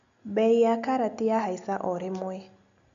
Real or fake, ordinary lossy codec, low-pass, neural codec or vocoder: real; none; 7.2 kHz; none